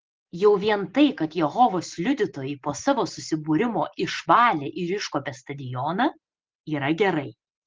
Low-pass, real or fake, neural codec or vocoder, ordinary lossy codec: 7.2 kHz; real; none; Opus, 16 kbps